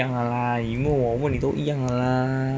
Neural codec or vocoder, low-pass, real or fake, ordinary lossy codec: none; none; real; none